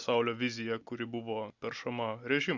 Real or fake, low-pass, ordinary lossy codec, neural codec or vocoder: real; 7.2 kHz; Opus, 64 kbps; none